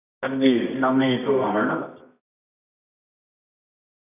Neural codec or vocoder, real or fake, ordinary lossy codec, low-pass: codec, 24 kHz, 0.9 kbps, WavTokenizer, medium music audio release; fake; none; 3.6 kHz